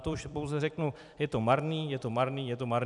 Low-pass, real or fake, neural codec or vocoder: 10.8 kHz; fake; vocoder, 44.1 kHz, 128 mel bands every 256 samples, BigVGAN v2